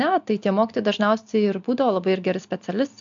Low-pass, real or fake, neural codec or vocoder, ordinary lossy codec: 7.2 kHz; real; none; MP3, 64 kbps